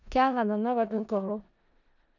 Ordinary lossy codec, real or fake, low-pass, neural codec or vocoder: none; fake; 7.2 kHz; codec, 16 kHz in and 24 kHz out, 0.4 kbps, LongCat-Audio-Codec, four codebook decoder